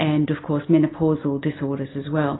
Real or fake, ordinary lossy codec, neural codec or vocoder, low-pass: real; AAC, 16 kbps; none; 7.2 kHz